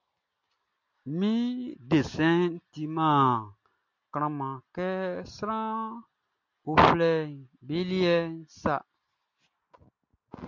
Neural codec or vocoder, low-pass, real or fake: none; 7.2 kHz; real